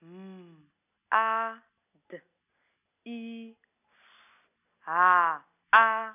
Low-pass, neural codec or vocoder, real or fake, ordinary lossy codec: 3.6 kHz; none; real; none